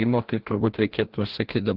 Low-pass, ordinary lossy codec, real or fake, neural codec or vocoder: 5.4 kHz; Opus, 16 kbps; fake; codec, 16 kHz, 1 kbps, FreqCodec, larger model